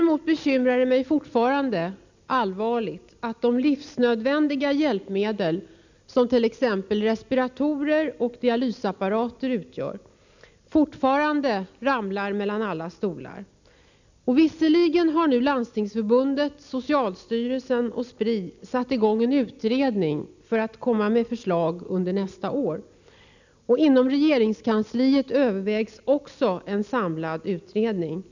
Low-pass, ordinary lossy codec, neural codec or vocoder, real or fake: 7.2 kHz; none; none; real